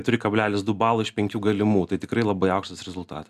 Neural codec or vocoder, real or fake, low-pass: none; real; 14.4 kHz